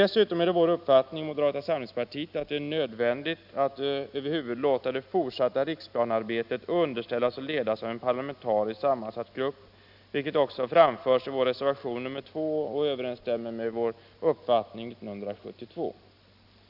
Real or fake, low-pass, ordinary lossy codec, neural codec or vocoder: real; 5.4 kHz; AAC, 48 kbps; none